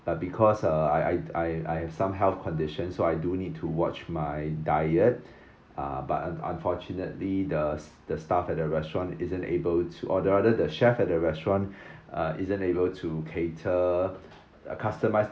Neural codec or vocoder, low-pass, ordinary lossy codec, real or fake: none; none; none; real